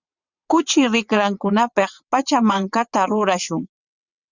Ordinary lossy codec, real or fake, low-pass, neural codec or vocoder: Opus, 64 kbps; fake; 7.2 kHz; vocoder, 22.05 kHz, 80 mel bands, WaveNeXt